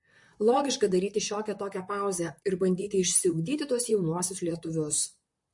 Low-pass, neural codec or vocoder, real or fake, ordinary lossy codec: 10.8 kHz; vocoder, 44.1 kHz, 128 mel bands, Pupu-Vocoder; fake; MP3, 48 kbps